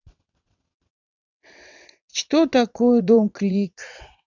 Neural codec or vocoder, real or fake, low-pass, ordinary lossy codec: vocoder, 22.05 kHz, 80 mel bands, WaveNeXt; fake; 7.2 kHz; none